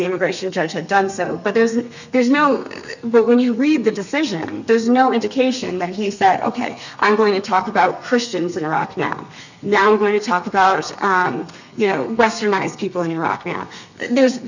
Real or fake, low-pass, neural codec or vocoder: fake; 7.2 kHz; codec, 32 kHz, 1.9 kbps, SNAC